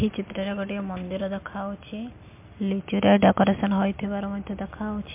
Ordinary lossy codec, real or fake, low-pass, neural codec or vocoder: MP3, 32 kbps; real; 3.6 kHz; none